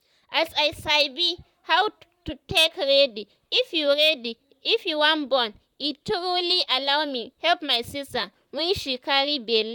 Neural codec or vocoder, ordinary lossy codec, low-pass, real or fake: vocoder, 44.1 kHz, 128 mel bands, Pupu-Vocoder; none; 19.8 kHz; fake